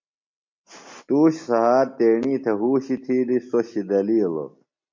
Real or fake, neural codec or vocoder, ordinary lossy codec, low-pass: real; none; MP3, 48 kbps; 7.2 kHz